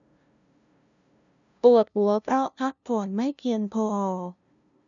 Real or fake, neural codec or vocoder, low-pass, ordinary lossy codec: fake; codec, 16 kHz, 0.5 kbps, FunCodec, trained on LibriTTS, 25 frames a second; 7.2 kHz; none